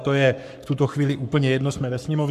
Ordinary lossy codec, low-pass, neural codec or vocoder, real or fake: MP3, 96 kbps; 14.4 kHz; codec, 44.1 kHz, 7.8 kbps, Pupu-Codec; fake